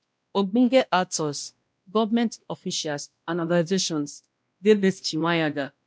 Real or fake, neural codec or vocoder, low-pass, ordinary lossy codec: fake; codec, 16 kHz, 1 kbps, X-Codec, WavLM features, trained on Multilingual LibriSpeech; none; none